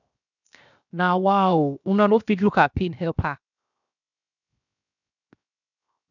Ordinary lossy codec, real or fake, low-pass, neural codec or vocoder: none; fake; 7.2 kHz; codec, 16 kHz, 0.7 kbps, FocalCodec